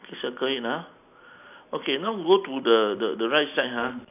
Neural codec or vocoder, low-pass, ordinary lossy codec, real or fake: none; 3.6 kHz; none; real